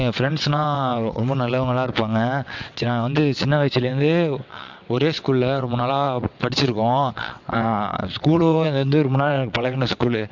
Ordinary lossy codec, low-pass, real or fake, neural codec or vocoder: MP3, 64 kbps; 7.2 kHz; fake; vocoder, 22.05 kHz, 80 mel bands, WaveNeXt